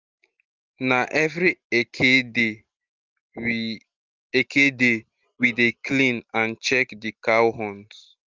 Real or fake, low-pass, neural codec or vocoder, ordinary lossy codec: real; 7.2 kHz; none; Opus, 32 kbps